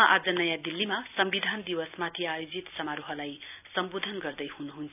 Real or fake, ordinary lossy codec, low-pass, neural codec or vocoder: real; none; 3.6 kHz; none